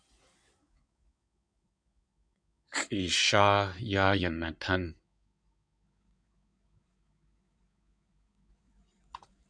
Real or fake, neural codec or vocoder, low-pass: fake; codec, 16 kHz in and 24 kHz out, 2.2 kbps, FireRedTTS-2 codec; 9.9 kHz